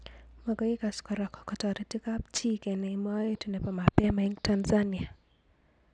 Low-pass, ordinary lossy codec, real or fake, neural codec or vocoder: 9.9 kHz; none; real; none